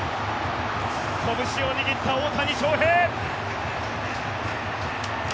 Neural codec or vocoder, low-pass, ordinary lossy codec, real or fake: none; none; none; real